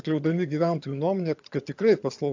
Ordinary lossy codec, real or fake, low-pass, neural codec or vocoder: AAC, 48 kbps; fake; 7.2 kHz; vocoder, 22.05 kHz, 80 mel bands, HiFi-GAN